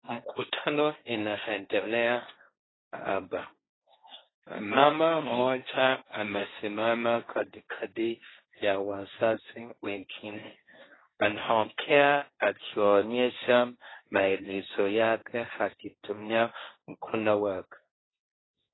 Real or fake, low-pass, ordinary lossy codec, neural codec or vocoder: fake; 7.2 kHz; AAC, 16 kbps; codec, 16 kHz, 1.1 kbps, Voila-Tokenizer